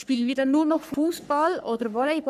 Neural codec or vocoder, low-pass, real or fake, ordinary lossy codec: codec, 44.1 kHz, 3.4 kbps, Pupu-Codec; 14.4 kHz; fake; none